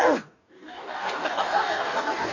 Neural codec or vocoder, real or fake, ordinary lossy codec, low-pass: codec, 44.1 kHz, 2.6 kbps, DAC; fake; none; 7.2 kHz